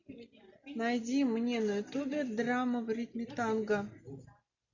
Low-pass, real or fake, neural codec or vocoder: 7.2 kHz; real; none